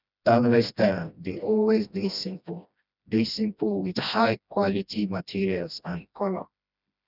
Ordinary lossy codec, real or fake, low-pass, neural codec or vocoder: none; fake; 5.4 kHz; codec, 16 kHz, 1 kbps, FreqCodec, smaller model